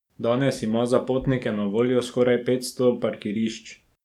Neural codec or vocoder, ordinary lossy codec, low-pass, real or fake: codec, 44.1 kHz, 7.8 kbps, DAC; none; 19.8 kHz; fake